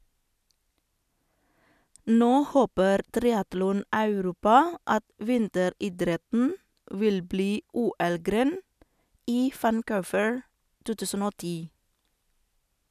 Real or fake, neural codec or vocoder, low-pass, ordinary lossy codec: real; none; 14.4 kHz; none